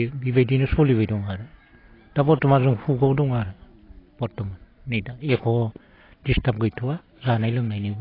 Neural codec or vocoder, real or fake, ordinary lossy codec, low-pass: none; real; AAC, 24 kbps; 5.4 kHz